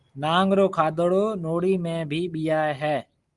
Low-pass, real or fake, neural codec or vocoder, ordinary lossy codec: 10.8 kHz; real; none; Opus, 24 kbps